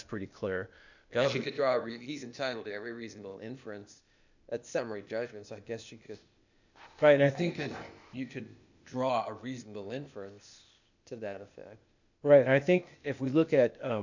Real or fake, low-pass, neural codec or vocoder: fake; 7.2 kHz; codec, 16 kHz, 0.8 kbps, ZipCodec